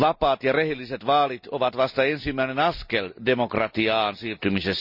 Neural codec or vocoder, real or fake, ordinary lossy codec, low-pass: none; real; none; 5.4 kHz